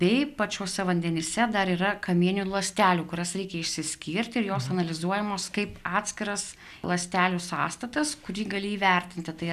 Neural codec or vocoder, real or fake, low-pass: none; real; 14.4 kHz